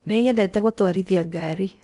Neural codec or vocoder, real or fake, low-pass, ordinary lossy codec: codec, 16 kHz in and 24 kHz out, 0.8 kbps, FocalCodec, streaming, 65536 codes; fake; 10.8 kHz; none